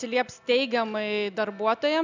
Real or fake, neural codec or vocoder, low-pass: real; none; 7.2 kHz